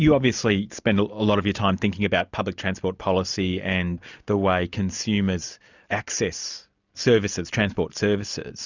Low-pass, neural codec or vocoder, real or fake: 7.2 kHz; none; real